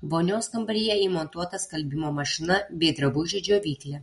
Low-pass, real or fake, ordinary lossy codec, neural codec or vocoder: 19.8 kHz; real; MP3, 48 kbps; none